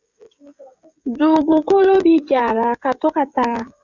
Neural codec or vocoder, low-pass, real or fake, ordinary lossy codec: codec, 16 kHz, 16 kbps, FreqCodec, smaller model; 7.2 kHz; fake; Opus, 64 kbps